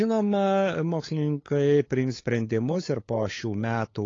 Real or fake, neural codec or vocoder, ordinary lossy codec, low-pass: fake; codec, 16 kHz, 8 kbps, FunCodec, trained on LibriTTS, 25 frames a second; AAC, 32 kbps; 7.2 kHz